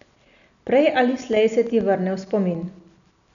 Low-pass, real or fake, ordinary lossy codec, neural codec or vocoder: 7.2 kHz; real; none; none